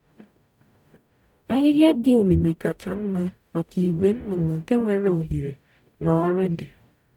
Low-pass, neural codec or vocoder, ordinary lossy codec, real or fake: 19.8 kHz; codec, 44.1 kHz, 0.9 kbps, DAC; none; fake